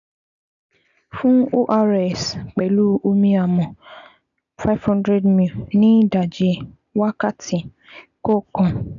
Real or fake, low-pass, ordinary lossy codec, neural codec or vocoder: real; 7.2 kHz; none; none